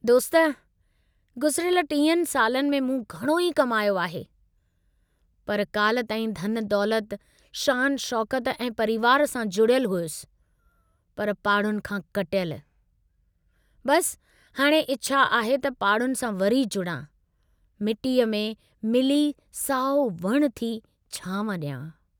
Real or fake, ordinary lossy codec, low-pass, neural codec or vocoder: real; none; none; none